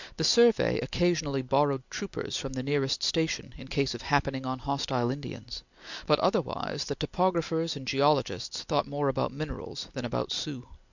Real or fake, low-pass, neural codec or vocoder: real; 7.2 kHz; none